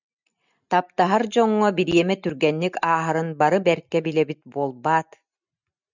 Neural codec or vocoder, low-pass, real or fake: none; 7.2 kHz; real